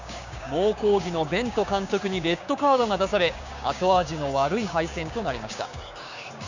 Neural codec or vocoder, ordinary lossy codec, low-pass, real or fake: codec, 16 kHz, 6 kbps, DAC; none; 7.2 kHz; fake